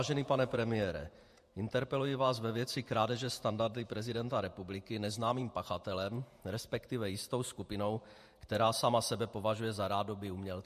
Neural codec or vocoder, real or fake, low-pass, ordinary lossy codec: vocoder, 48 kHz, 128 mel bands, Vocos; fake; 14.4 kHz; MP3, 64 kbps